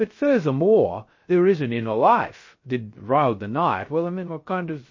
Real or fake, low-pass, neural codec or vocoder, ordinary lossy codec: fake; 7.2 kHz; codec, 16 kHz, 0.3 kbps, FocalCodec; MP3, 32 kbps